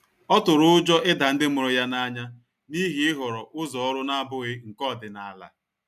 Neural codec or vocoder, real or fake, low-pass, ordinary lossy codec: none; real; 14.4 kHz; none